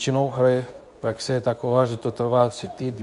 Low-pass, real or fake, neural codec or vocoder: 10.8 kHz; fake; codec, 24 kHz, 0.9 kbps, WavTokenizer, medium speech release version 2